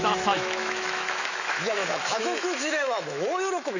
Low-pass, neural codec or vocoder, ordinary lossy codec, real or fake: 7.2 kHz; autoencoder, 48 kHz, 128 numbers a frame, DAC-VAE, trained on Japanese speech; none; fake